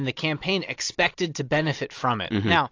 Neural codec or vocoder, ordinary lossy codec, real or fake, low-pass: none; AAC, 48 kbps; real; 7.2 kHz